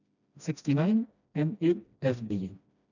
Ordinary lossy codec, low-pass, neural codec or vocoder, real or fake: none; 7.2 kHz; codec, 16 kHz, 1 kbps, FreqCodec, smaller model; fake